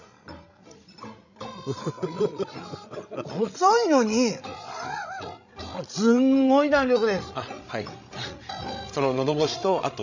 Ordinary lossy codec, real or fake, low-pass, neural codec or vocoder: none; fake; 7.2 kHz; codec, 16 kHz, 16 kbps, FreqCodec, larger model